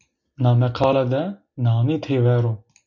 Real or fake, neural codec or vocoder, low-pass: real; none; 7.2 kHz